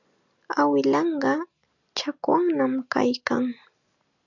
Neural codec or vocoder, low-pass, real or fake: none; 7.2 kHz; real